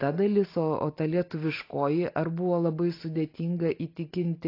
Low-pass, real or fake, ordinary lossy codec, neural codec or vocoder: 5.4 kHz; real; AAC, 32 kbps; none